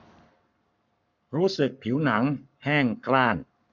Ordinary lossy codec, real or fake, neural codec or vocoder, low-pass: none; fake; codec, 44.1 kHz, 7.8 kbps, Pupu-Codec; 7.2 kHz